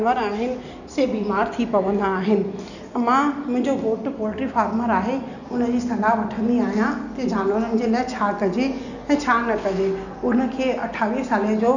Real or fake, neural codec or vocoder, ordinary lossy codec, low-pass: real; none; none; 7.2 kHz